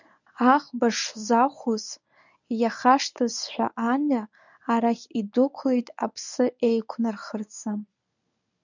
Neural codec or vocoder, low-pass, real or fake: codec, 24 kHz, 0.9 kbps, WavTokenizer, medium speech release version 1; 7.2 kHz; fake